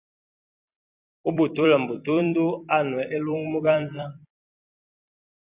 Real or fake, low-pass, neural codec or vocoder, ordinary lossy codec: fake; 3.6 kHz; vocoder, 44.1 kHz, 128 mel bands every 256 samples, BigVGAN v2; Opus, 64 kbps